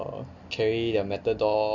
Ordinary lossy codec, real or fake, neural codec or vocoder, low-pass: none; real; none; 7.2 kHz